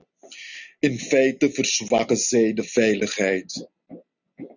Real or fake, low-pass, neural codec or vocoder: real; 7.2 kHz; none